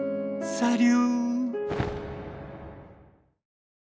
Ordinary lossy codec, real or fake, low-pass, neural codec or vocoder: none; real; none; none